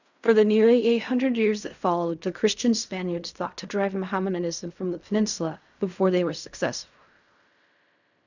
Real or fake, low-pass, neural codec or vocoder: fake; 7.2 kHz; codec, 16 kHz in and 24 kHz out, 0.4 kbps, LongCat-Audio-Codec, fine tuned four codebook decoder